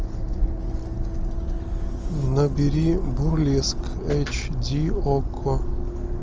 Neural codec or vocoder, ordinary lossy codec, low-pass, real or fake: none; Opus, 24 kbps; 7.2 kHz; real